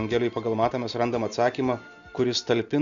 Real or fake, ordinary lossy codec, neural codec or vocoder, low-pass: real; Opus, 64 kbps; none; 7.2 kHz